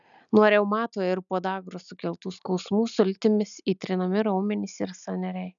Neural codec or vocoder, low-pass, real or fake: none; 7.2 kHz; real